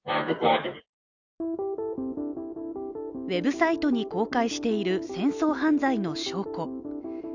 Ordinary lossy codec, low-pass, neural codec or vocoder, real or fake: none; 7.2 kHz; none; real